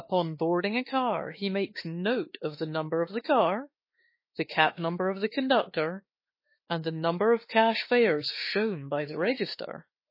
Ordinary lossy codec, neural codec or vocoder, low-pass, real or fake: MP3, 24 kbps; autoencoder, 48 kHz, 32 numbers a frame, DAC-VAE, trained on Japanese speech; 5.4 kHz; fake